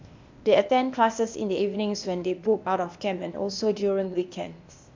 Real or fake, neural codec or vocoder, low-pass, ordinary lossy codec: fake; codec, 16 kHz, 0.8 kbps, ZipCodec; 7.2 kHz; MP3, 64 kbps